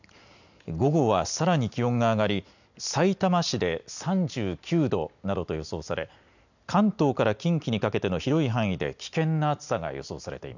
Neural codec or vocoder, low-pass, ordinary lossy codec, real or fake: none; 7.2 kHz; none; real